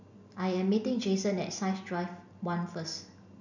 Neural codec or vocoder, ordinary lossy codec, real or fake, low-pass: none; none; real; 7.2 kHz